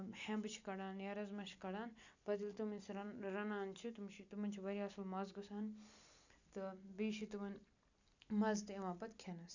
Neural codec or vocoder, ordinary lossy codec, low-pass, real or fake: none; none; 7.2 kHz; real